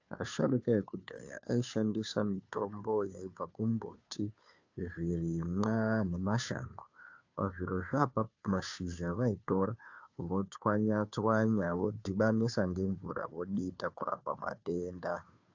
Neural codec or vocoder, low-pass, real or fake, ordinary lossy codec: codec, 16 kHz, 2 kbps, FunCodec, trained on Chinese and English, 25 frames a second; 7.2 kHz; fake; AAC, 48 kbps